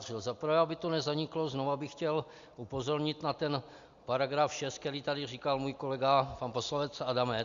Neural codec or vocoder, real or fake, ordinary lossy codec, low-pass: none; real; Opus, 64 kbps; 7.2 kHz